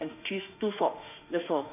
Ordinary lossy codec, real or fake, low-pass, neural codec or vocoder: none; fake; 3.6 kHz; autoencoder, 48 kHz, 32 numbers a frame, DAC-VAE, trained on Japanese speech